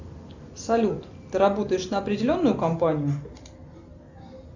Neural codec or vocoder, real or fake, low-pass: none; real; 7.2 kHz